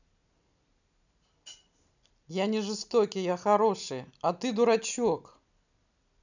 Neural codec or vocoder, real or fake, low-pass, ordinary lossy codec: none; real; 7.2 kHz; none